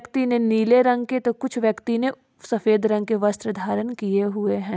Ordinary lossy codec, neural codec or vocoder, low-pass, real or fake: none; none; none; real